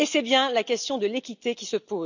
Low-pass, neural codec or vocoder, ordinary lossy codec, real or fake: 7.2 kHz; none; none; real